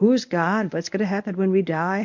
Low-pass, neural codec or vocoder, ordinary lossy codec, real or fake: 7.2 kHz; codec, 24 kHz, 0.9 kbps, WavTokenizer, medium speech release version 1; MP3, 48 kbps; fake